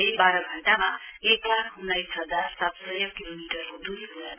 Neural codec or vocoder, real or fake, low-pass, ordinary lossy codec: none; real; 3.6 kHz; AAC, 32 kbps